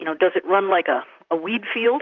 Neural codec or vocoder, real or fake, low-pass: vocoder, 44.1 kHz, 128 mel bands, Pupu-Vocoder; fake; 7.2 kHz